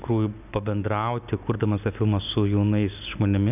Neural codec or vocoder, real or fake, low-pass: none; real; 3.6 kHz